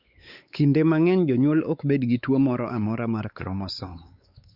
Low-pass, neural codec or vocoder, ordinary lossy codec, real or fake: 5.4 kHz; codec, 16 kHz, 4 kbps, X-Codec, WavLM features, trained on Multilingual LibriSpeech; Opus, 64 kbps; fake